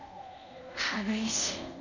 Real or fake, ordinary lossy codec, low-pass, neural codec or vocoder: fake; none; 7.2 kHz; codec, 24 kHz, 0.5 kbps, DualCodec